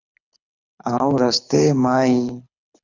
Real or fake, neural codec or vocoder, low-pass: fake; codec, 24 kHz, 6 kbps, HILCodec; 7.2 kHz